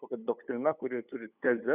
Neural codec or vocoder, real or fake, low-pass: codec, 16 kHz, 8 kbps, FunCodec, trained on LibriTTS, 25 frames a second; fake; 3.6 kHz